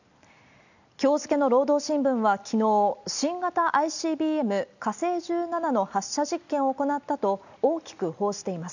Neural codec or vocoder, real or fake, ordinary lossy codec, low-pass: none; real; none; 7.2 kHz